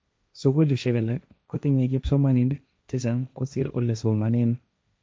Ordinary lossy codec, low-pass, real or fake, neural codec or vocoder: none; none; fake; codec, 16 kHz, 1.1 kbps, Voila-Tokenizer